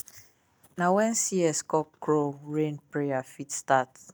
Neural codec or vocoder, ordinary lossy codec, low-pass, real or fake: none; none; none; real